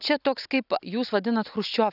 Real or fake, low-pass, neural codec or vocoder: real; 5.4 kHz; none